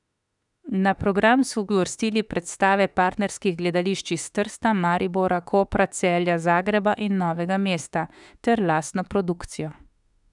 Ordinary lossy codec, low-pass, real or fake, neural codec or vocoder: none; 10.8 kHz; fake; autoencoder, 48 kHz, 32 numbers a frame, DAC-VAE, trained on Japanese speech